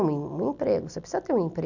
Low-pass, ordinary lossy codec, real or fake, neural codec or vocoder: 7.2 kHz; none; real; none